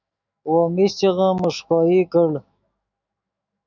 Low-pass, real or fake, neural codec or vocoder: 7.2 kHz; fake; autoencoder, 48 kHz, 128 numbers a frame, DAC-VAE, trained on Japanese speech